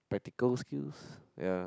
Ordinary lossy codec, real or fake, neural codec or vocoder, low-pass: none; real; none; none